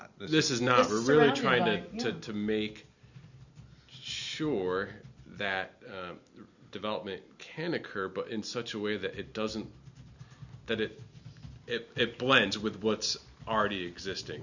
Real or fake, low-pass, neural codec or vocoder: real; 7.2 kHz; none